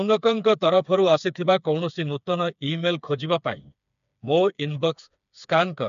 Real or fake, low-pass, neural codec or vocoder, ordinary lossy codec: fake; 7.2 kHz; codec, 16 kHz, 4 kbps, FreqCodec, smaller model; none